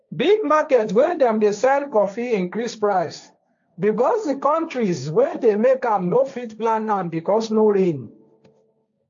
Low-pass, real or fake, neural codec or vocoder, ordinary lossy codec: 7.2 kHz; fake; codec, 16 kHz, 1.1 kbps, Voila-Tokenizer; AAC, 64 kbps